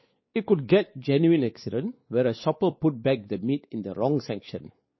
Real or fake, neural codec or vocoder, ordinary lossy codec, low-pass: fake; codec, 16 kHz, 4 kbps, X-Codec, WavLM features, trained on Multilingual LibriSpeech; MP3, 24 kbps; 7.2 kHz